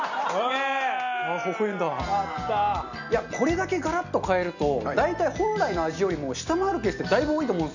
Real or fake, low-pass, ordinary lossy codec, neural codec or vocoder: real; 7.2 kHz; none; none